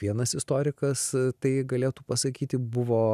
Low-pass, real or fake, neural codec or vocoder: 14.4 kHz; real; none